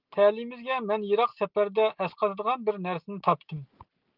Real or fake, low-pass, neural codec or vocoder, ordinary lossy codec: real; 5.4 kHz; none; Opus, 24 kbps